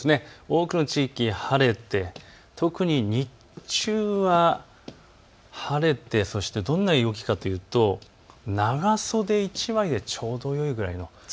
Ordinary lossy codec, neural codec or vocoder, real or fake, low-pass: none; none; real; none